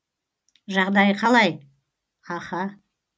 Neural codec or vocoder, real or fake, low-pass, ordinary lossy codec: none; real; none; none